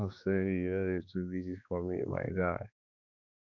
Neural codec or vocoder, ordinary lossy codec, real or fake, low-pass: codec, 16 kHz, 2 kbps, X-Codec, HuBERT features, trained on balanced general audio; none; fake; 7.2 kHz